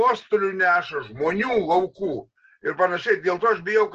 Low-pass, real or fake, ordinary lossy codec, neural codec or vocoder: 7.2 kHz; real; Opus, 16 kbps; none